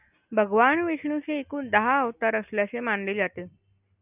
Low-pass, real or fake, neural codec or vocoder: 3.6 kHz; real; none